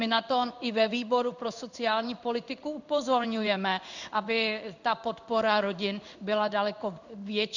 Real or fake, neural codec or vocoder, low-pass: fake; codec, 16 kHz in and 24 kHz out, 1 kbps, XY-Tokenizer; 7.2 kHz